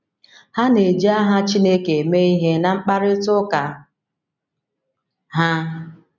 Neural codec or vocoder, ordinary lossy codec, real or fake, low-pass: none; none; real; 7.2 kHz